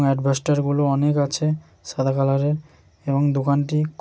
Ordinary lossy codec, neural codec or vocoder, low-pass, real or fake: none; none; none; real